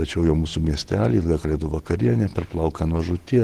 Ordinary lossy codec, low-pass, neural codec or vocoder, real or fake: Opus, 16 kbps; 14.4 kHz; vocoder, 48 kHz, 128 mel bands, Vocos; fake